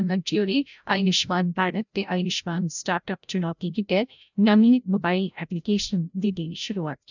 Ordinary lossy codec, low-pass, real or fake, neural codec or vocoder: none; 7.2 kHz; fake; codec, 16 kHz, 0.5 kbps, FreqCodec, larger model